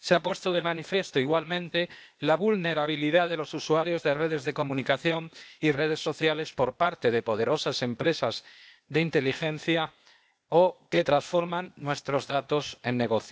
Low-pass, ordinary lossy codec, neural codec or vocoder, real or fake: none; none; codec, 16 kHz, 0.8 kbps, ZipCodec; fake